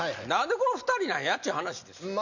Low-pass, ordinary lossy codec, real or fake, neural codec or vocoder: 7.2 kHz; none; real; none